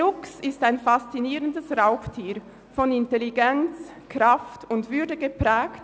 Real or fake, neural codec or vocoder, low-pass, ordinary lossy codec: real; none; none; none